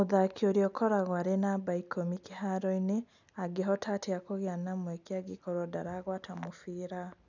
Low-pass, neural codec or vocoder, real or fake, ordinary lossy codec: 7.2 kHz; none; real; none